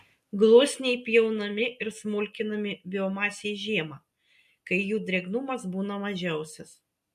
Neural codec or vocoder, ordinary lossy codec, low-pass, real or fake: none; MP3, 64 kbps; 14.4 kHz; real